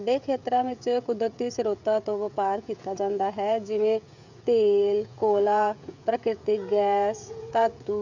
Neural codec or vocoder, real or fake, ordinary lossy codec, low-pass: codec, 16 kHz, 16 kbps, FreqCodec, smaller model; fake; none; 7.2 kHz